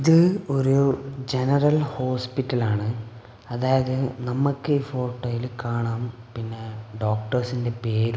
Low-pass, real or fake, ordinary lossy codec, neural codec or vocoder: none; real; none; none